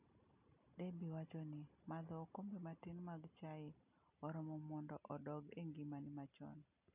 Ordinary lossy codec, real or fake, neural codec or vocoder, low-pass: none; real; none; 3.6 kHz